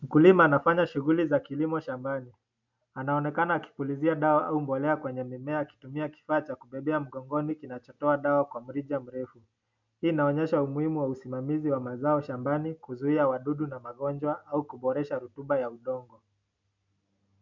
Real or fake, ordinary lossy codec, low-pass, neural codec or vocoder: real; MP3, 64 kbps; 7.2 kHz; none